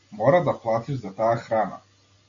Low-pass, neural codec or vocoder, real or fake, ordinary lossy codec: 7.2 kHz; none; real; MP3, 64 kbps